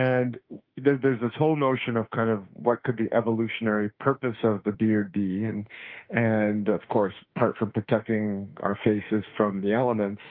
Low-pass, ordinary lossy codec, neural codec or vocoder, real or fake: 5.4 kHz; Opus, 24 kbps; autoencoder, 48 kHz, 32 numbers a frame, DAC-VAE, trained on Japanese speech; fake